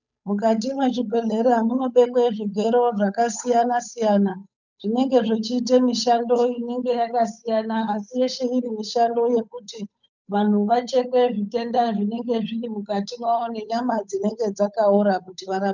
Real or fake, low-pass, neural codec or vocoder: fake; 7.2 kHz; codec, 16 kHz, 8 kbps, FunCodec, trained on Chinese and English, 25 frames a second